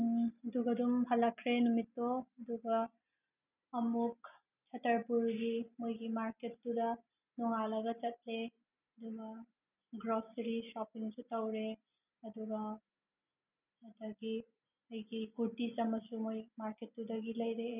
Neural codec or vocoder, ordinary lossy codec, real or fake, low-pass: none; none; real; 3.6 kHz